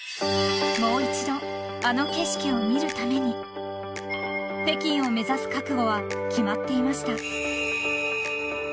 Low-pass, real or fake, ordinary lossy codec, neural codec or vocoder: none; real; none; none